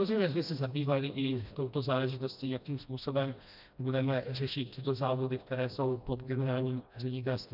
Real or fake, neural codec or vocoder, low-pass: fake; codec, 16 kHz, 1 kbps, FreqCodec, smaller model; 5.4 kHz